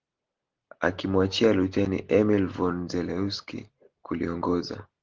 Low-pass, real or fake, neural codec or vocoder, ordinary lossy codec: 7.2 kHz; real; none; Opus, 16 kbps